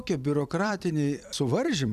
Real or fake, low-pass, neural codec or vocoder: fake; 14.4 kHz; vocoder, 44.1 kHz, 128 mel bands every 256 samples, BigVGAN v2